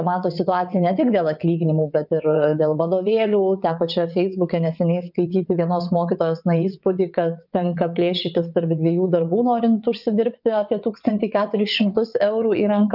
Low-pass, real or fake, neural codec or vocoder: 5.4 kHz; fake; vocoder, 22.05 kHz, 80 mel bands, WaveNeXt